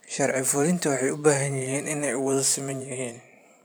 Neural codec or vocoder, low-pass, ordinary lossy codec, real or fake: vocoder, 44.1 kHz, 128 mel bands every 512 samples, BigVGAN v2; none; none; fake